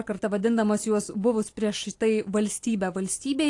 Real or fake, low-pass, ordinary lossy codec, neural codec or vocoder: real; 10.8 kHz; AAC, 48 kbps; none